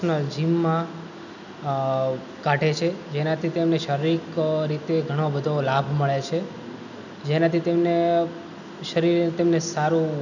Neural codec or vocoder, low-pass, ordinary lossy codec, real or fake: none; 7.2 kHz; none; real